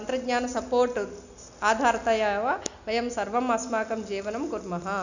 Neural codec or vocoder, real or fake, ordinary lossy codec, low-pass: none; real; none; 7.2 kHz